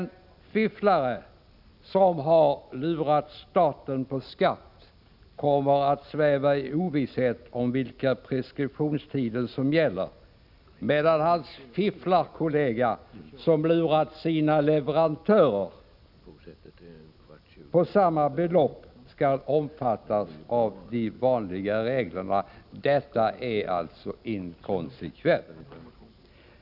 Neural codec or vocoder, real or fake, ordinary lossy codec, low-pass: none; real; none; 5.4 kHz